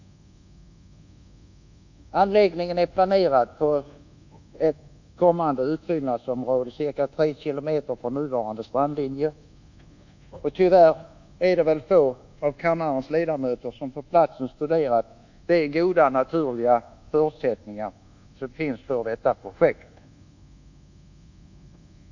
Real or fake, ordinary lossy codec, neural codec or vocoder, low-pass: fake; none; codec, 24 kHz, 1.2 kbps, DualCodec; 7.2 kHz